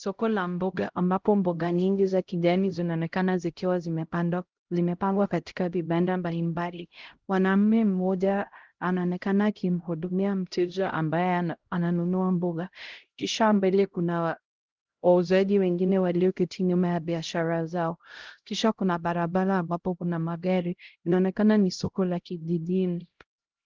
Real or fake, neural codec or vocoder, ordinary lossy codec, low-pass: fake; codec, 16 kHz, 0.5 kbps, X-Codec, HuBERT features, trained on LibriSpeech; Opus, 16 kbps; 7.2 kHz